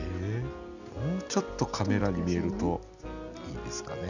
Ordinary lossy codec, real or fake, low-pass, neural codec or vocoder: none; real; 7.2 kHz; none